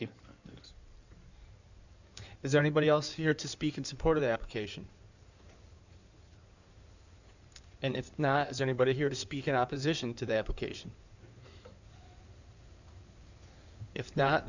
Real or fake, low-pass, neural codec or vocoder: fake; 7.2 kHz; codec, 16 kHz in and 24 kHz out, 2.2 kbps, FireRedTTS-2 codec